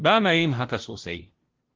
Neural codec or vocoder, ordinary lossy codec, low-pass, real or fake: codec, 16 kHz, 0.5 kbps, FunCodec, trained on LibriTTS, 25 frames a second; Opus, 16 kbps; 7.2 kHz; fake